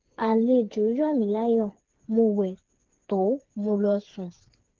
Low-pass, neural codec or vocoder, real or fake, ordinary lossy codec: 7.2 kHz; codec, 16 kHz, 4 kbps, FreqCodec, smaller model; fake; Opus, 16 kbps